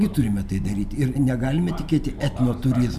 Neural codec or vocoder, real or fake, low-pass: none; real; 14.4 kHz